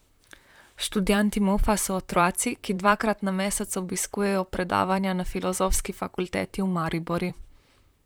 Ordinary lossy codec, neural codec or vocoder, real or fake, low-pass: none; vocoder, 44.1 kHz, 128 mel bands, Pupu-Vocoder; fake; none